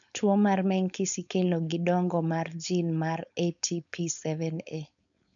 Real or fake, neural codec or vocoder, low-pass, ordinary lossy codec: fake; codec, 16 kHz, 4.8 kbps, FACodec; 7.2 kHz; none